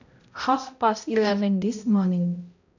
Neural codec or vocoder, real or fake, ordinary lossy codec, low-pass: codec, 16 kHz, 0.5 kbps, X-Codec, HuBERT features, trained on balanced general audio; fake; none; 7.2 kHz